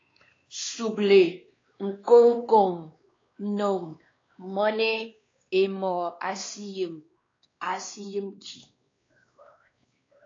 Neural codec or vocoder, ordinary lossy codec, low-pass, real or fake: codec, 16 kHz, 2 kbps, X-Codec, WavLM features, trained on Multilingual LibriSpeech; AAC, 32 kbps; 7.2 kHz; fake